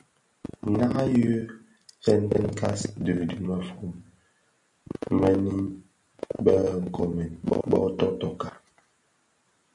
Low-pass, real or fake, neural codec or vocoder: 10.8 kHz; real; none